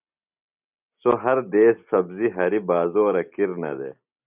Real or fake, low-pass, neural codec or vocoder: real; 3.6 kHz; none